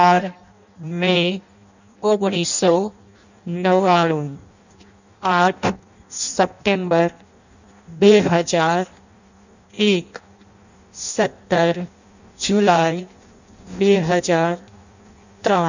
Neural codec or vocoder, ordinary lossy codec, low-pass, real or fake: codec, 16 kHz in and 24 kHz out, 0.6 kbps, FireRedTTS-2 codec; none; 7.2 kHz; fake